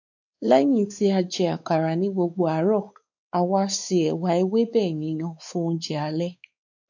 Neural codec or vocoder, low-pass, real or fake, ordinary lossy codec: codec, 16 kHz, 4 kbps, X-Codec, WavLM features, trained on Multilingual LibriSpeech; 7.2 kHz; fake; none